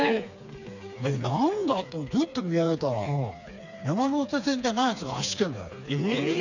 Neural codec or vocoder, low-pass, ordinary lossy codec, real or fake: codec, 16 kHz, 4 kbps, FreqCodec, smaller model; 7.2 kHz; none; fake